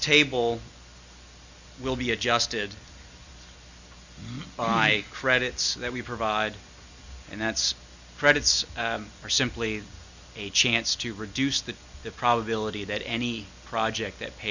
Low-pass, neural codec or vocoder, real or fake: 7.2 kHz; none; real